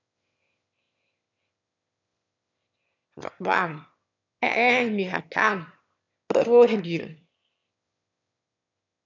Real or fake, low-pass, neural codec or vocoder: fake; 7.2 kHz; autoencoder, 22.05 kHz, a latent of 192 numbers a frame, VITS, trained on one speaker